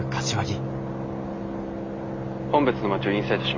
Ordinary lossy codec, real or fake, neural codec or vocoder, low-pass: MP3, 32 kbps; real; none; 7.2 kHz